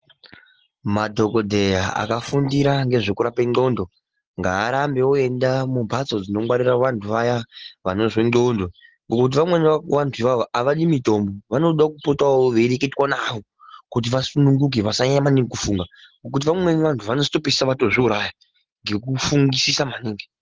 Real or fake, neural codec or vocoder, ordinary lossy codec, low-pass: real; none; Opus, 16 kbps; 7.2 kHz